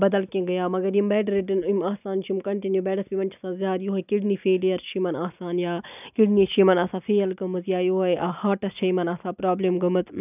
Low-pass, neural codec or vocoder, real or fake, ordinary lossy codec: 3.6 kHz; none; real; none